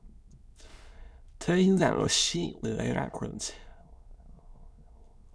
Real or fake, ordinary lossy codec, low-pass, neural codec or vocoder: fake; none; none; autoencoder, 22.05 kHz, a latent of 192 numbers a frame, VITS, trained on many speakers